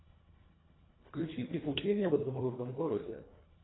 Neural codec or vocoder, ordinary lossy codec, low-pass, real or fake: codec, 24 kHz, 1.5 kbps, HILCodec; AAC, 16 kbps; 7.2 kHz; fake